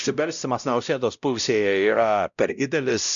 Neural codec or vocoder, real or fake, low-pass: codec, 16 kHz, 0.5 kbps, X-Codec, WavLM features, trained on Multilingual LibriSpeech; fake; 7.2 kHz